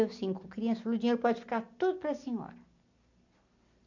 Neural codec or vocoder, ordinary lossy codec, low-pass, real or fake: none; none; 7.2 kHz; real